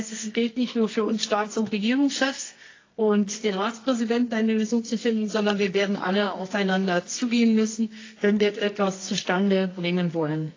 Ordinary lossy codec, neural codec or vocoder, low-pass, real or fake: AAC, 32 kbps; codec, 24 kHz, 0.9 kbps, WavTokenizer, medium music audio release; 7.2 kHz; fake